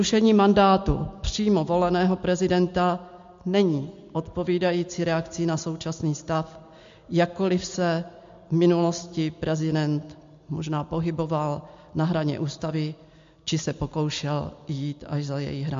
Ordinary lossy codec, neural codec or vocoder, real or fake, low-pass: MP3, 48 kbps; none; real; 7.2 kHz